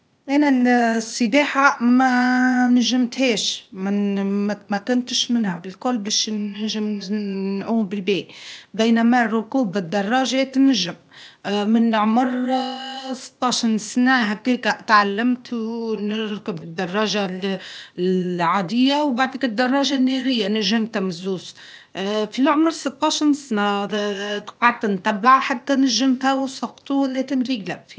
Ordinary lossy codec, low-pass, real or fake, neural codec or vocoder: none; none; fake; codec, 16 kHz, 0.8 kbps, ZipCodec